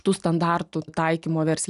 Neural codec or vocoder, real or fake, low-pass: none; real; 10.8 kHz